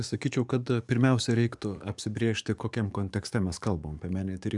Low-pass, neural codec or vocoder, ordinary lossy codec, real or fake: 10.8 kHz; codec, 44.1 kHz, 7.8 kbps, DAC; MP3, 96 kbps; fake